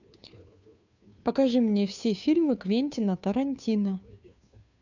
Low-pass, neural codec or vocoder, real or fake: 7.2 kHz; codec, 16 kHz, 2 kbps, FunCodec, trained on Chinese and English, 25 frames a second; fake